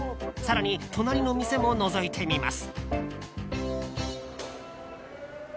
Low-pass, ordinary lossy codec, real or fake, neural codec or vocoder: none; none; real; none